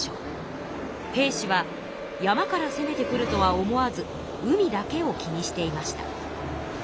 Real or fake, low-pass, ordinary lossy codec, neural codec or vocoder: real; none; none; none